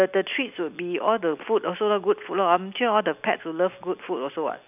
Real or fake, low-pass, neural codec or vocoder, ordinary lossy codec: real; 3.6 kHz; none; none